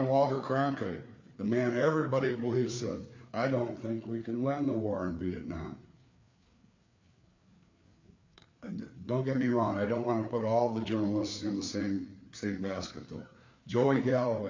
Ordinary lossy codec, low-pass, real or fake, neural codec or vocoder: MP3, 48 kbps; 7.2 kHz; fake; codec, 16 kHz, 4 kbps, FreqCodec, larger model